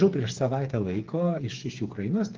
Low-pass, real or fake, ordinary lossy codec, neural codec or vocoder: 7.2 kHz; fake; Opus, 16 kbps; codec, 16 kHz, 8 kbps, FreqCodec, smaller model